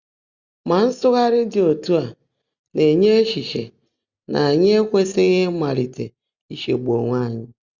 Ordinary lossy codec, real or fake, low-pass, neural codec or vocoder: Opus, 64 kbps; real; 7.2 kHz; none